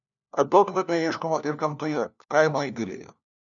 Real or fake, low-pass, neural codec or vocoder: fake; 7.2 kHz; codec, 16 kHz, 1 kbps, FunCodec, trained on LibriTTS, 50 frames a second